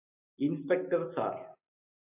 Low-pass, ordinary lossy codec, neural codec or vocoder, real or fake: 3.6 kHz; AAC, 24 kbps; none; real